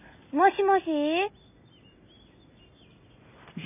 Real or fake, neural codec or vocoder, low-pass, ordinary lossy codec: real; none; 3.6 kHz; none